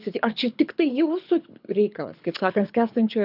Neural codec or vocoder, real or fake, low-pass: codec, 24 kHz, 6 kbps, HILCodec; fake; 5.4 kHz